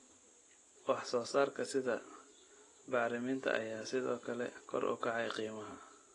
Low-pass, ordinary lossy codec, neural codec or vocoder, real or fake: 10.8 kHz; AAC, 32 kbps; none; real